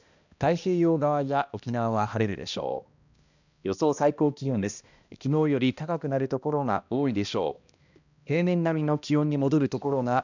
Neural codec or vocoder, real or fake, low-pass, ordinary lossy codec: codec, 16 kHz, 1 kbps, X-Codec, HuBERT features, trained on balanced general audio; fake; 7.2 kHz; none